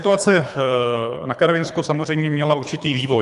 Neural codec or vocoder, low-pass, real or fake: codec, 24 kHz, 3 kbps, HILCodec; 10.8 kHz; fake